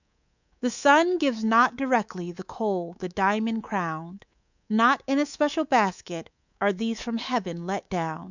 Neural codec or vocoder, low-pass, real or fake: codec, 24 kHz, 3.1 kbps, DualCodec; 7.2 kHz; fake